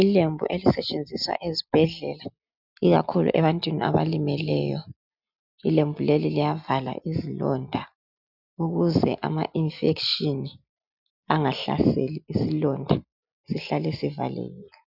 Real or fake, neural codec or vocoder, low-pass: real; none; 5.4 kHz